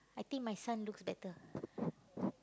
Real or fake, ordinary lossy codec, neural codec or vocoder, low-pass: real; none; none; none